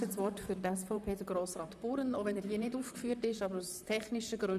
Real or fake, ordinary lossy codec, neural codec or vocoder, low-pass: fake; none; vocoder, 44.1 kHz, 128 mel bands, Pupu-Vocoder; 14.4 kHz